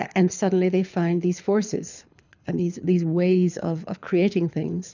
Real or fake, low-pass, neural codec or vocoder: fake; 7.2 kHz; codec, 24 kHz, 6 kbps, HILCodec